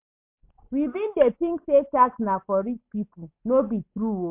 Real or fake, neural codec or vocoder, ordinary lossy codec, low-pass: real; none; MP3, 32 kbps; 3.6 kHz